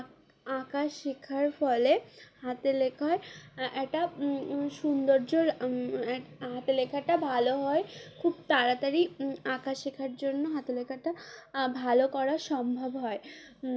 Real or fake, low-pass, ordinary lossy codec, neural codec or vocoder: real; none; none; none